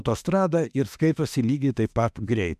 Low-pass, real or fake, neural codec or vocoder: 14.4 kHz; fake; autoencoder, 48 kHz, 32 numbers a frame, DAC-VAE, trained on Japanese speech